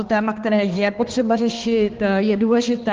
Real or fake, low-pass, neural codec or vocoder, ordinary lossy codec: fake; 7.2 kHz; codec, 16 kHz, 2 kbps, X-Codec, HuBERT features, trained on balanced general audio; Opus, 16 kbps